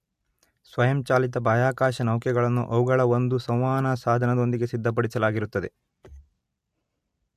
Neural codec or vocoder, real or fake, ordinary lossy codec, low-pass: none; real; MP3, 64 kbps; 14.4 kHz